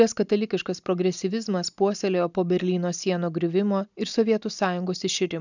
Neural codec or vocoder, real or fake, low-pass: none; real; 7.2 kHz